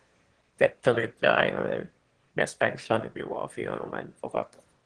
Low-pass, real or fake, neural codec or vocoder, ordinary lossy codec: 9.9 kHz; fake; autoencoder, 22.05 kHz, a latent of 192 numbers a frame, VITS, trained on one speaker; Opus, 16 kbps